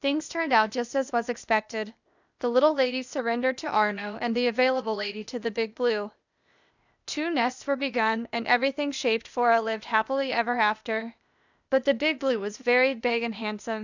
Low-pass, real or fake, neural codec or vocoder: 7.2 kHz; fake; codec, 16 kHz, 0.8 kbps, ZipCodec